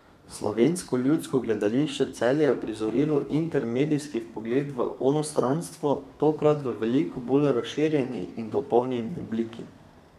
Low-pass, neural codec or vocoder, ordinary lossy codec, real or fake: 14.4 kHz; codec, 32 kHz, 1.9 kbps, SNAC; none; fake